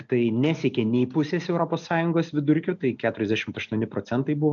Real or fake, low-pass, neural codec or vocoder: real; 7.2 kHz; none